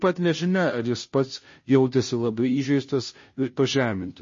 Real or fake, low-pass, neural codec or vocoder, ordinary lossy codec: fake; 7.2 kHz; codec, 16 kHz, 0.5 kbps, FunCodec, trained on Chinese and English, 25 frames a second; MP3, 32 kbps